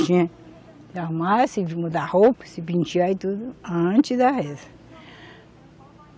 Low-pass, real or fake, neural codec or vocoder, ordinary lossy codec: none; real; none; none